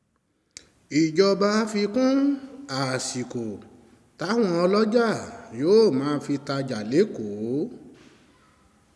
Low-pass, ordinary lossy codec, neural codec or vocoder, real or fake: none; none; none; real